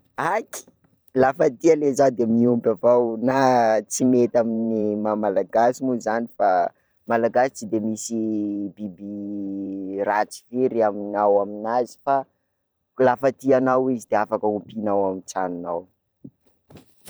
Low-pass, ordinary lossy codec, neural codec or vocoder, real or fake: none; none; vocoder, 44.1 kHz, 128 mel bands every 512 samples, BigVGAN v2; fake